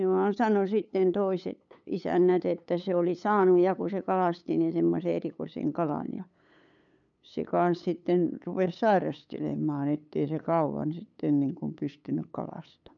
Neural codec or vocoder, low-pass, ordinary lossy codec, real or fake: codec, 16 kHz, 8 kbps, FunCodec, trained on LibriTTS, 25 frames a second; 7.2 kHz; none; fake